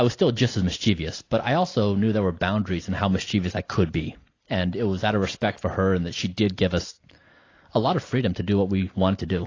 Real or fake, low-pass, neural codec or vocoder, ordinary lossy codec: real; 7.2 kHz; none; AAC, 32 kbps